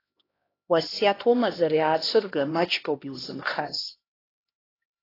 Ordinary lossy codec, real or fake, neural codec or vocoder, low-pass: AAC, 24 kbps; fake; codec, 16 kHz, 1 kbps, X-Codec, HuBERT features, trained on LibriSpeech; 5.4 kHz